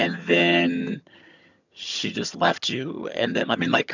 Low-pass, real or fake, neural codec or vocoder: 7.2 kHz; fake; vocoder, 22.05 kHz, 80 mel bands, HiFi-GAN